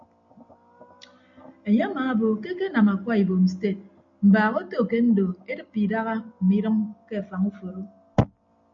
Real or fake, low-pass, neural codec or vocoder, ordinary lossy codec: real; 7.2 kHz; none; MP3, 96 kbps